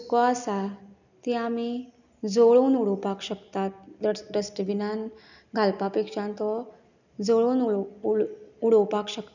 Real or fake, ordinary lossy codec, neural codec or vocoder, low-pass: real; none; none; 7.2 kHz